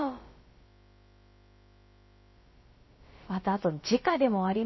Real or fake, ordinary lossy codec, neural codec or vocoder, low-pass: fake; MP3, 24 kbps; codec, 16 kHz, about 1 kbps, DyCAST, with the encoder's durations; 7.2 kHz